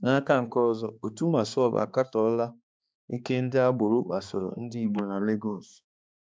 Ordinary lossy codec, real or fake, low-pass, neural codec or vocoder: none; fake; none; codec, 16 kHz, 2 kbps, X-Codec, HuBERT features, trained on balanced general audio